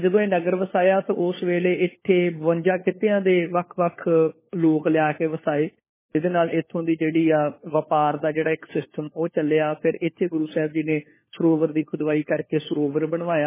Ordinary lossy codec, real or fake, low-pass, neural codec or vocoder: MP3, 16 kbps; fake; 3.6 kHz; codec, 16 kHz, 4 kbps, FunCodec, trained on LibriTTS, 50 frames a second